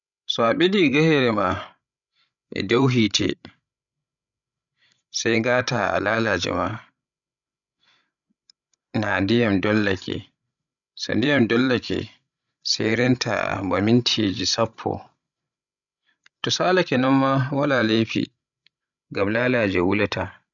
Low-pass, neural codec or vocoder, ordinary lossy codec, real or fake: 7.2 kHz; codec, 16 kHz, 16 kbps, FreqCodec, larger model; none; fake